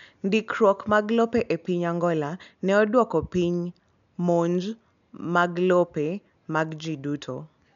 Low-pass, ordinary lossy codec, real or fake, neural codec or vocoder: 7.2 kHz; none; real; none